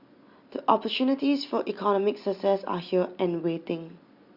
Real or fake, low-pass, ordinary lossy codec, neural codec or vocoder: real; 5.4 kHz; Opus, 64 kbps; none